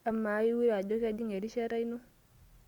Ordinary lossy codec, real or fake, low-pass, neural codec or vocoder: Opus, 64 kbps; real; 19.8 kHz; none